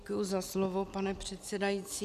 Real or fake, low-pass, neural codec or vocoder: real; 14.4 kHz; none